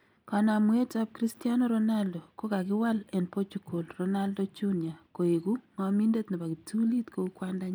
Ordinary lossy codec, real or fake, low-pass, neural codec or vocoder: none; real; none; none